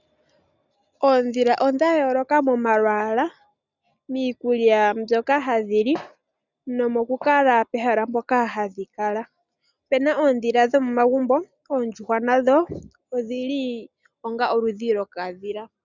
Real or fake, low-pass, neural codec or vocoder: real; 7.2 kHz; none